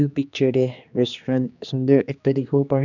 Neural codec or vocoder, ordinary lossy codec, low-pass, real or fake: codec, 16 kHz, 2 kbps, X-Codec, HuBERT features, trained on general audio; none; 7.2 kHz; fake